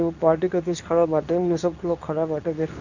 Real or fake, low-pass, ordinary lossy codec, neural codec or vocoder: fake; 7.2 kHz; none; codec, 24 kHz, 0.9 kbps, WavTokenizer, medium speech release version 2